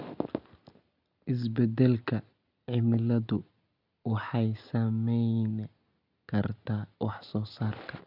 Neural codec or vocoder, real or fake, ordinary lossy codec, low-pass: none; real; none; 5.4 kHz